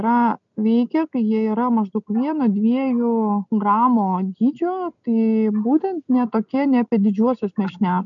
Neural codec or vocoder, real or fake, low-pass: none; real; 7.2 kHz